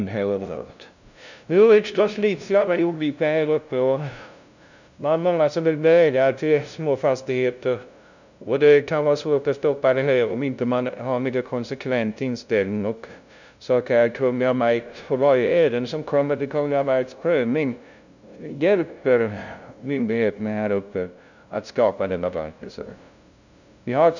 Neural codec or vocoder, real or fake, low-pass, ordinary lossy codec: codec, 16 kHz, 0.5 kbps, FunCodec, trained on LibriTTS, 25 frames a second; fake; 7.2 kHz; none